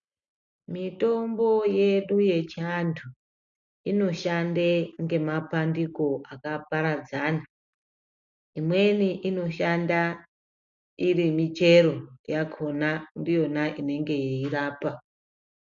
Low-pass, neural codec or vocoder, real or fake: 7.2 kHz; none; real